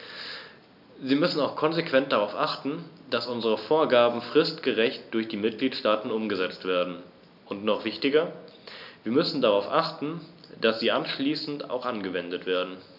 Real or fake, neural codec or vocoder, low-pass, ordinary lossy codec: real; none; 5.4 kHz; none